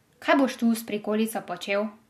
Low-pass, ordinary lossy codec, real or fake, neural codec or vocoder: 14.4 kHz; MP3, 64 kbps; real; none